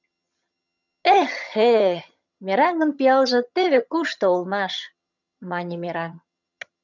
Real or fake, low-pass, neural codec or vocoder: fake; 7.2 kHz; vocoder, 22.05 kHz, 80 mel bands, HiFi-GAN